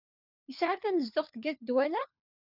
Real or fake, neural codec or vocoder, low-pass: fake; codec, 16 kHz, 4 kbps, FunCodec, trained on LibriTTS, 50 frames a second; 5.4 kHz